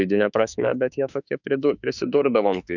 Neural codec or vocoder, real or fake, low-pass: autoencoder, 48 kHz, 32 numbers a frame, DAC-VAE, trained on Japanese speech; fake; 7.2 kHz